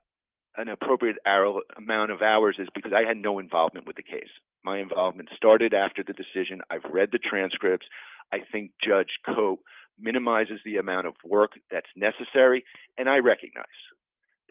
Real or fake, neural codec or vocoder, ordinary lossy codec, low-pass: real; none; Opus, 32 kbps; 3.6 kHz